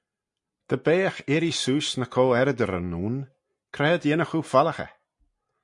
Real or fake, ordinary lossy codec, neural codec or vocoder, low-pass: real; MP3, 64 kbps; none; 10.8 kHz